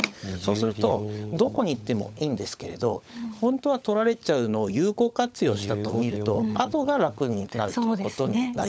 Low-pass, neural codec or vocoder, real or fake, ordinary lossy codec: none; codec, 16 kHz, 16 kbps, FunCodec, trained on LibriTTS, 50 frames a second; fake; none